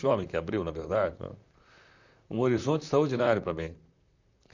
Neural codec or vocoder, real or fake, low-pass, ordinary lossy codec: vocoder, 44.1 kHz, 128 mel bands, Pupu-Vocoder; fake; 7.2 kHz; none